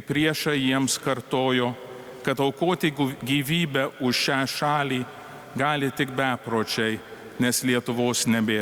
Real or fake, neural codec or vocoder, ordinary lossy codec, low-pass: real; none; Opus, 64 kbps; 19.8 kHz